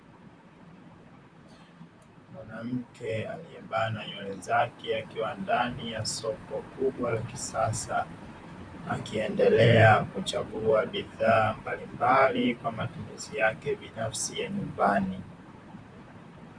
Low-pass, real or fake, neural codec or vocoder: 9.9 kHz; fake; vocoder, 44.1 kHz, 128 mel bands, Pupu-Vocoder